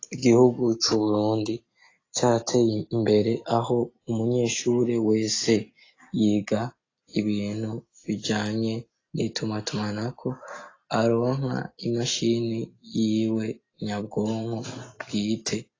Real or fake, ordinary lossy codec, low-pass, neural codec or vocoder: real; AAC, 32 kbps; 7.2 kHz; none